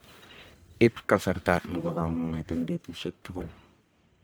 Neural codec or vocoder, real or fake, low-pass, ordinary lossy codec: codec, 44.1 kHz, 1.7 kbps, Pupu-Codec; fake; none; none